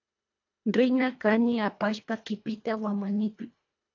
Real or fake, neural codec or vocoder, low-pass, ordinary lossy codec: fake; codec, 24 kHz, 1.5 kbps, HILCodec; 7.2 kHz; AAC, 48 kbps